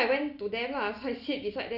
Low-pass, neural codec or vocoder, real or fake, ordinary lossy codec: 5.4 kHz; none; real; none